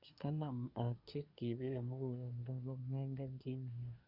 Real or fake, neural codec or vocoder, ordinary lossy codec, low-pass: fake; codec, 24 kHz, 1 kbps, SNAC; none; 5.4 kHz